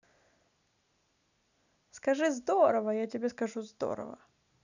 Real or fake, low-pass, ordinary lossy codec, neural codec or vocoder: real; 7.2 kHz; none; none